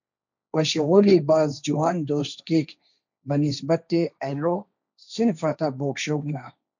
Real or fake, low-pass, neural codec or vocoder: fake; 7.2 kHz; codec, 16 kHz, 1.1 kbps, Voila-Tokenizer